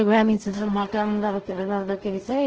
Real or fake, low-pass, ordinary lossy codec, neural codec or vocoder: fake; 7.2 kHz; Opus, 16 kbps; codec, 16 kHz in and 24 kHz out, 0.4 kbps, LongCat-Audio-Codec, two codebook decoder